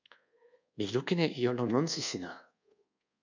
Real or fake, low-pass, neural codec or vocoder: fake; 7.2 kHz; codec, 24 kHz, 1.2 kbps, DualCodec